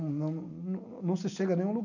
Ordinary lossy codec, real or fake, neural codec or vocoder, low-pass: none; real; none; 7.2 kHz